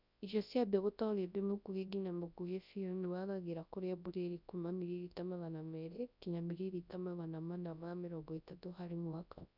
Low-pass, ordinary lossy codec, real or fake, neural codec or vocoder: 5.4 kHz; none; fake; codec, 24 kHz, 0.9 kbps, WavTokenizer, large speech release